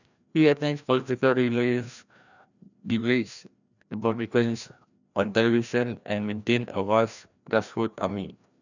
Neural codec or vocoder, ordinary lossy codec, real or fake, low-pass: codec, 16 kHz, 1 kbps, FreqCodec, larger model; none; fake; 7.2 kHz